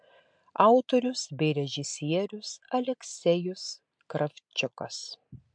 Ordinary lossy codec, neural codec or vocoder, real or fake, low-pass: MP3, 64 kbps; none; real; 9.9 kHz